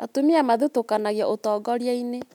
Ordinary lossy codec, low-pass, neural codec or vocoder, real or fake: none; 19.8 kHz; none; real